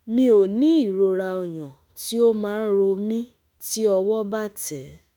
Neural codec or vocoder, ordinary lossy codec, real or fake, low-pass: autoencoder, 48 kHz, 32 numbers a frame, DAC-VAE, trained on Japanese speech; none; fake; none